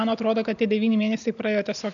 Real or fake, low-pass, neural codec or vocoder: real; 7.2 kHz; none